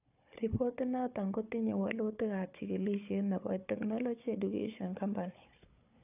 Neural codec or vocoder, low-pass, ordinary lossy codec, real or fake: codec, 16 kHz, 4 kbps, FunCodec, trained on Chinese and English, 50 frames a second; 3.6 kHz; AAC, 32 kbps; fake